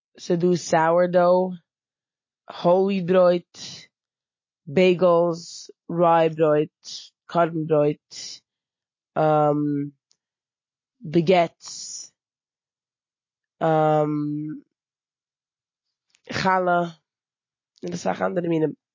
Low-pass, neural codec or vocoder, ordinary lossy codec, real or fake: 7.2 kHz; none; MP3, 32 kbps; real